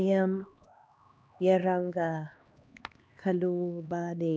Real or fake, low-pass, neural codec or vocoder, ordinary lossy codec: fake; none; codec, 16 kHz, 2 kbps, X-Codec, HuBERT features, trained on LibriSpeech; none